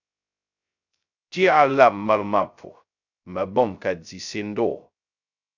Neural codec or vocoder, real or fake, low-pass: codec, 16 kHz, 0.2 kbps, FocalCodec; fake; 7.2 kHz